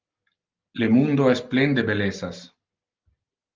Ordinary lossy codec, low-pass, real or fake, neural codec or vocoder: Opus, 24 kbps; 7.2 kHz; real; none